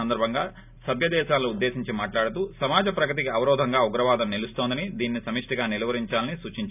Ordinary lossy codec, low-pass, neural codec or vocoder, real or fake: none; 3.6 kHz; none; real